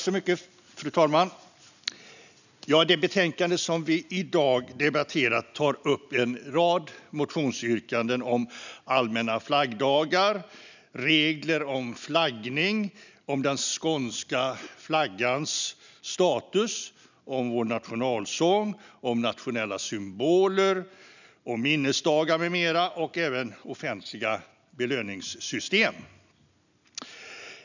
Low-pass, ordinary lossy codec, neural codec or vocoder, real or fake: 7.2 kHz; none; none; real